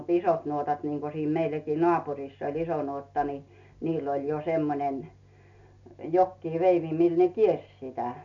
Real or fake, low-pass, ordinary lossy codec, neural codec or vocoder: real; 7.2 kHz; none; none